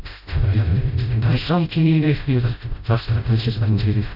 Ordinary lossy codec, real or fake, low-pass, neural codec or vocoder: none; fake; 5.4 kHz; codec, 16 kHz, 0.5 kbps, FreqCodec, smaller model